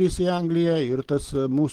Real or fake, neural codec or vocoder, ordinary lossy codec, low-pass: real; none; Opus, 16 kbps; 19.8 kHz